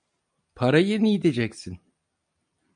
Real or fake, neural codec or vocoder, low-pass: real; none; 9.9 kHz